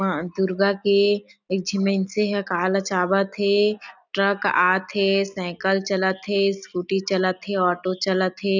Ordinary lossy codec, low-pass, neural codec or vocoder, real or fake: none; 7.2 kHz; none; real